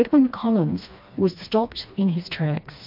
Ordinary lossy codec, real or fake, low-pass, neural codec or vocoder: MP3, 48 kbps; fake; 5.4 kHz; codec, 16 kHz, 2 kbps, FreqCodec, smaller model